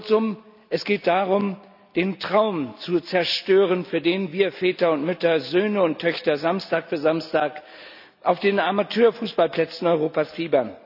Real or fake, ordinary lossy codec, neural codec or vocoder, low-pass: real; none; none; 5.4 kHz